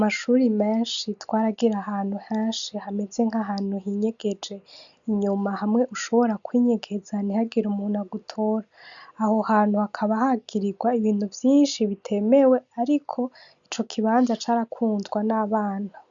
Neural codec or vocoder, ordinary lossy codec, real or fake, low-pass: none; MP3, 96 kbps; real; 7.2 kHz